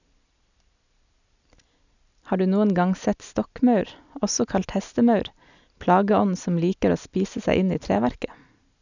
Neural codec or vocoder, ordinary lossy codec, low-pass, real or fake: none; none; 7.2 kHz; real